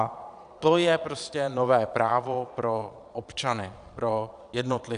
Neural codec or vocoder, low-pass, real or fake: vocoder, 22.05 kHz, 80 mel bands, Vocos; 9.9 kHz; fake